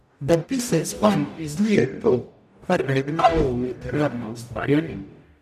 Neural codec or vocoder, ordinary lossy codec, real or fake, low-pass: codec, 44.1 kHz, 0.9 kbps, DAC; none; fake; 14.4 kHz